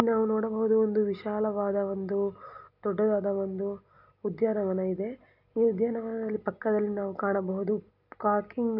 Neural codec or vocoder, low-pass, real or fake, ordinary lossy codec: none; 5.4 kHz; real; none